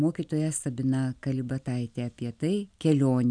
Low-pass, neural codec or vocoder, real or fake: 9.9 kHz; none; real